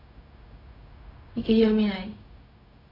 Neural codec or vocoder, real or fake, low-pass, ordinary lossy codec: codec, 16 kHz, 0.4 kbps, LongCat-Audio-Codec; fake; 5.4 kHz; AAC, 24 kbps